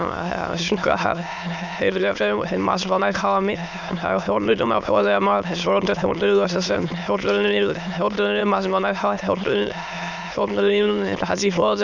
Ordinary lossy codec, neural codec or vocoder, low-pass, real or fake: none; autoencoder, 22.05 kHz, a latent of 192 numbers a frame, VITS, trained on many speakers; 7.2 kHz; fake